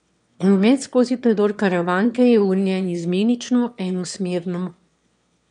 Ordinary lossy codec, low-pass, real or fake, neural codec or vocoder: none; 9.9 kHz; fake; autoencoder, 22.05 kHz, a latent of 192 numbers a frame, VITS, trained on one speaker